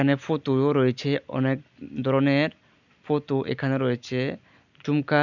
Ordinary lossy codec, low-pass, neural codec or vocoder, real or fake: none; 7.2 kHz; none; real